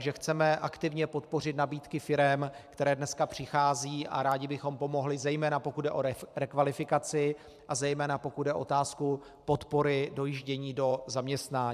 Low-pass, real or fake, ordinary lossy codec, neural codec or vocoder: 14.4 kHz; fake; AAC, 96 kbps; vocoder, 44.1 kHz, 128 mel bands every 512 samples, BigVGAN v2